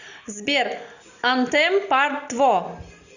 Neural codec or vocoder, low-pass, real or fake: none; 7.2 kHz; real